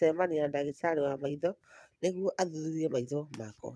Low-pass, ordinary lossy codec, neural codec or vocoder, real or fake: none; none; vocoder, 22.05 kHz, 80 mel bands, Vocos; fake